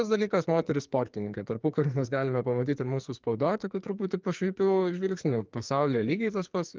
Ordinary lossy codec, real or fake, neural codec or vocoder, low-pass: Opus, 32 kbps; fake; codec, 44.1 kHz, 2.6 kbps, SNAC; 7.2 kHz